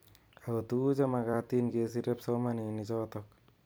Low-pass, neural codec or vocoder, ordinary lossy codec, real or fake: none; vocoder, 44.1 kHz, 128 mel bands every 256 samples, BigVGAN v2; none; fake